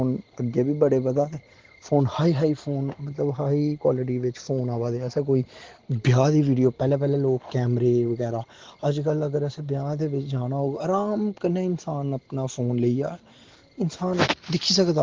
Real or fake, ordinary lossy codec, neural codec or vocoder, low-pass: real; Opus, 16 kbps; none; 7.2 kHz